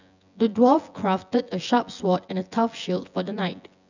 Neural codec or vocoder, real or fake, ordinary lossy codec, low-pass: vocoder, 24 kHz, 100 mel bands, Vocos; fake; none; 7.2 kHz